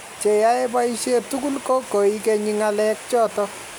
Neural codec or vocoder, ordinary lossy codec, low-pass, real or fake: none; none; none; real